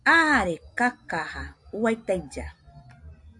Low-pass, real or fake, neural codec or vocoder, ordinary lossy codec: 10.8 kHz; real; none; AAC, 64 kbps